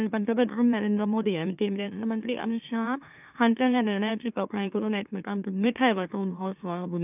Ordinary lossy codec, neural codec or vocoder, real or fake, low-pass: none; autoencoder, 44.1 kHz, a latent of 192 numbers a frame, MeloTTS; fake; 3.6 kHz